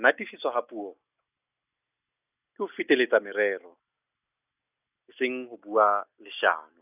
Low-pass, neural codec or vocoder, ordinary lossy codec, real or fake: 3.6 kHz; none; none; real